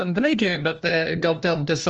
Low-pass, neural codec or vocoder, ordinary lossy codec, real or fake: 7.2 kHz; codec, 16 kHz, 1 kbps, FunCodec, trained on LibriTTS, 50 frames a second; Opus, 24 kbps; fake